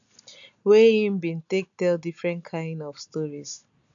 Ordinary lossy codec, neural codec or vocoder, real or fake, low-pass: none; none; real; 7.2 kHz